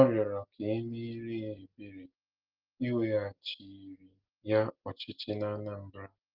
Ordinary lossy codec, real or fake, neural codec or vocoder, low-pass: Opus, 16 kbps; real; none; 5.4 kHz